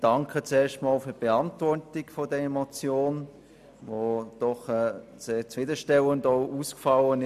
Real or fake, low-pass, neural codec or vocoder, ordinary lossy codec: real; 14.4 kHz; none; none